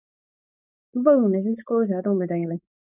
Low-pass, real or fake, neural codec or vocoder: 3.6 kHz; fake; codec, 16 kHz, 4.8 kbps, FACodec